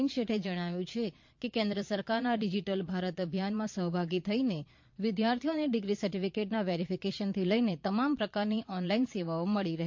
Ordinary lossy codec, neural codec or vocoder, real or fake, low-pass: MP3, 48 kbps; vocoder, 22.05 kHz, 80 mel bands, Vocos; fake; 7.2 kHz